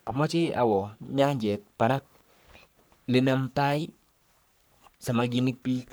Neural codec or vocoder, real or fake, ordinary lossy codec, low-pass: codec, 44.1 kHz, 3.4 kbps, Pupu-Codec; fake; none; none